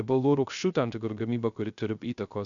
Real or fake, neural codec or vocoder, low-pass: fake; codec, 16 kHz, 0.3 kbps, FocalCodec; 7.2 kHz